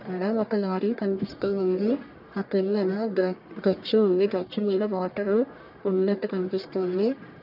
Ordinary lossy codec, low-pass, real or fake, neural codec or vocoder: none; 5.4 kHz; fake; codec, 44.1 kHz, 1.7 kbps, Pupu-Codec